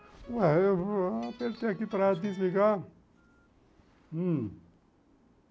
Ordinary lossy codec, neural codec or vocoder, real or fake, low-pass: none; none; real; none